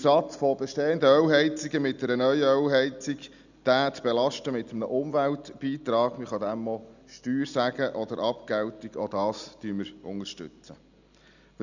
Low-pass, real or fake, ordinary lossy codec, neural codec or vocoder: 7.2 kHz; real; none; none